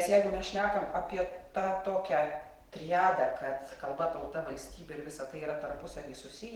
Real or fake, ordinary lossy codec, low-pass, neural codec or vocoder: fake; Opus, 16 kbps; 19.8 kHz; vocoder, 44.1 kHz, 128 mel bands every 512 samples, BigVGAN v2